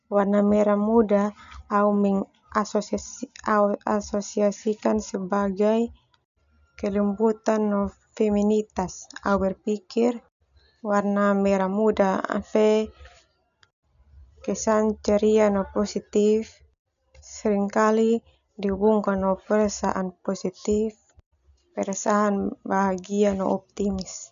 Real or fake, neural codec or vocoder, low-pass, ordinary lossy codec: real; none; 7.2 kHz; none